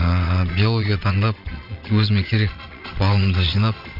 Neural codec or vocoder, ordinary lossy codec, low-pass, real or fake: vocoder, 22.05 kHz, 80 mel bands, Vocos; none; 5.4 kHz; fake